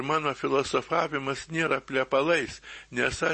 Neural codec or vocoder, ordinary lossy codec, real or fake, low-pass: none; MP3, 32 kbps; real; 10.8 kHz